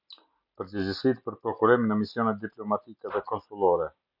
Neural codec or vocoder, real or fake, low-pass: none; real; 5.4 kHz